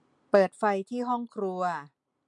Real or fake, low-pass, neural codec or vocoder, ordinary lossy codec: real; 10.8 kHz; none; MP3, 64 kbps